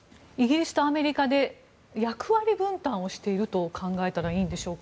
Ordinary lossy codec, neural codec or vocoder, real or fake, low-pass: none; none; real; none